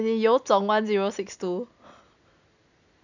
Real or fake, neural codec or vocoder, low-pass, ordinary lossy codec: real; none; 7.2 kHz; none